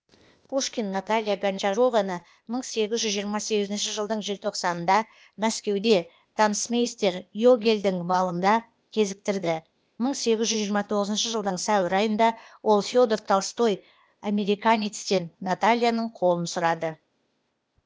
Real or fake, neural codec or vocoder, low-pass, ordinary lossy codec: fake; codec, 16 kHz, 0.8 kbps, ZipCodec; none; none